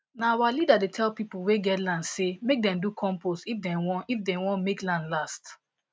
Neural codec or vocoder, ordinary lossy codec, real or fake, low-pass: none; none; real; none